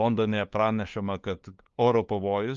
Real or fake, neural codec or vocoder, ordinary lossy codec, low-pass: fake; codec, 16 kHz, 2 kbps, FunCodec, trained on LibriTTS, 25 frames a second; Opus, 32 kbps; 7.2 kHz